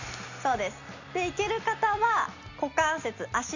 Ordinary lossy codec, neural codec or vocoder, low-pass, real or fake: none; none; 7.2 kHz; real